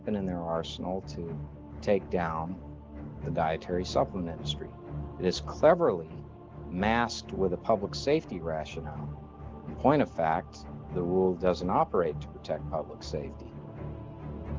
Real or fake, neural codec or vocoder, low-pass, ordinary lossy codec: real; none; 7.2 kHz; Opus, 16 kbps